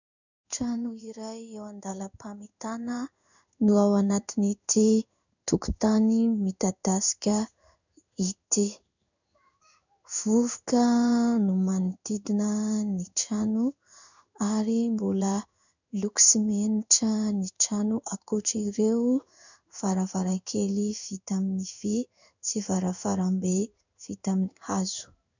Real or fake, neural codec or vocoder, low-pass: fake; codec, 16 kHz in and 24 kHz out, 1 kbps, XY-Tokenizer; 7.2 kHz